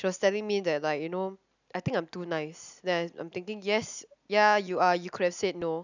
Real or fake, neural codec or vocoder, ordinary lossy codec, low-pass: real; none; none; 7.2 kHz